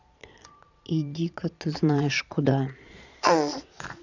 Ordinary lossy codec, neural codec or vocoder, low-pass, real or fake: none; vocoder, 22.05 kHz, 80 mel bands, Vocos; 7.2 kHz; fake